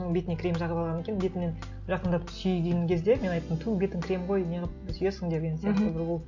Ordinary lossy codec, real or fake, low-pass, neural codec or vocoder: none; real; 7.2 kHz; none